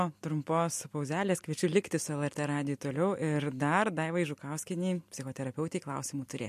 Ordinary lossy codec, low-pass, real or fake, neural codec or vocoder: MP3, 64 kbps; 14.4 kHz; real; none